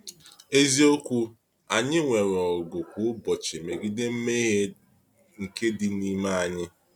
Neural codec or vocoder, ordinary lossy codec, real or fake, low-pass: none; MP3, 96 kbps; real; 19.8 kHz